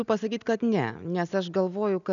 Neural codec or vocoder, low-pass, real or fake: none; 7.2 kHz; real